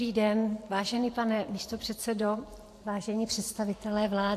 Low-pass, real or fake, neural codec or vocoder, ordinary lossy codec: 14.4 kHz; fake; vocoder, 44.1 kHz, 128 mel bands every 512 samples, BigVGAN v2; AAC, 64 kbps